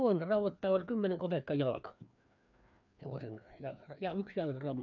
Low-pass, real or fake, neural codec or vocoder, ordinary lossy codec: 7.2 kHz; fake; codec, 16 kHz, 2 kbps, FreqCodec, larger model; none